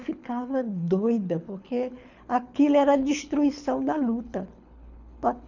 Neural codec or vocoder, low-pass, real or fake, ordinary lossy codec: codec, 24 kHz, 6 kbps, HILCodec; 7.2 kHz; fake; none